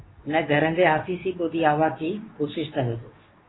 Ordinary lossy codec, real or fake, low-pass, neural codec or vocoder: AAC, 16 kbps; fake; 7.2 kHz; codec, 24 kHz, 0.9 kbps, WavTokenizer, medium speech release version 2